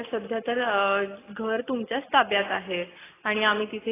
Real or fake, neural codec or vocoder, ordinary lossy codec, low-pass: real; none; AAC, 16 kbps; 3.6 kHz